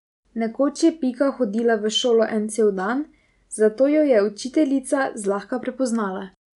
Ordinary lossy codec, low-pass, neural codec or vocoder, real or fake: none; 10.8 kHz; none; real